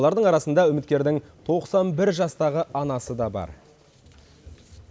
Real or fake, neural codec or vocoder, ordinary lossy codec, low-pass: real; none; none; none